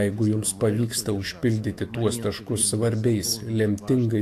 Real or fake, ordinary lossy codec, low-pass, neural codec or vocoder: fake; AAC, 64 kbps; 14.4 kHz; autoencoder, 48 kHz, 128 numbers a frame, DAC-VAE, trained on Japanese speech